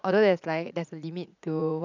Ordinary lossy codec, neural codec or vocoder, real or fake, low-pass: none; vocoder, 44.1 kHz, 80 mel bands, Vocos; fake; 7.2 kHz